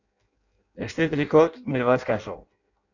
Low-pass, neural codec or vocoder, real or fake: 7.2 kHz; codec, 16 kHz in and 24 kHz out, 0.6 kbps, FireRedTTS-2 codec; fake